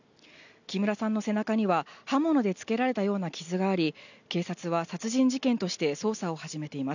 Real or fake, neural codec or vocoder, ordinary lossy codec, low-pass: real; none; none; 7.2 kHz